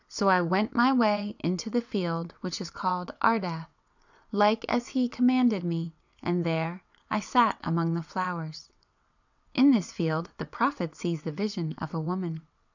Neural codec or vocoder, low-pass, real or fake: vocoder, 22.05 kHz, 80 mel bands, WaveNeXt; 7.2 kHz; fake